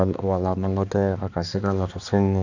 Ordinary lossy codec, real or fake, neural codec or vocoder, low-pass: none; fake; codec, 16 kHz, 2 kbps, X-Codec, HuBERT features, trained on balanced general audio; 7.2 kHz